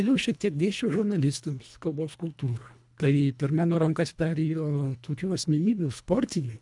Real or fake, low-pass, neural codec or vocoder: fake; 10.8 kHz; codec, 24 kHz, 1.5 kbps, HILCodec